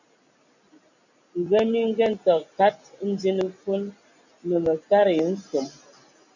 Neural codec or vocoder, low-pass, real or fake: none; 7.2 kHz; real